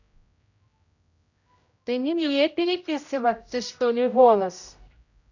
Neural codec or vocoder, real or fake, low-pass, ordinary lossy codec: codec, 16 kHz, 0.5 kbps, X-Codec, HuBERT features, trained on general audio; fake; 7.2 kHz; none